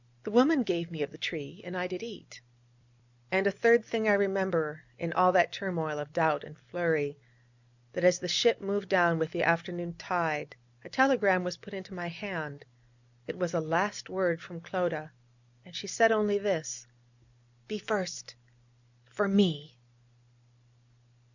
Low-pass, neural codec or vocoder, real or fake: 7.2 kHz; none; real